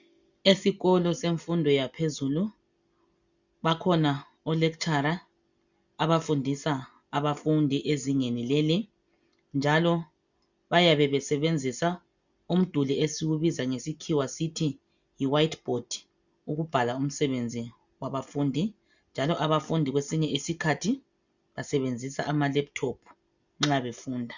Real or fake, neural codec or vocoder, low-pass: real; none; 7.2 kHz